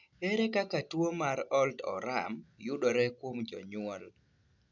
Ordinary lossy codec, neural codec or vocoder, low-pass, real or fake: none; none; 7.2 kHz; real